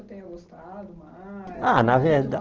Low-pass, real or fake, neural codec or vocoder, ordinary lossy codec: 7.2 kHz; real; none; Opus, 16 kbps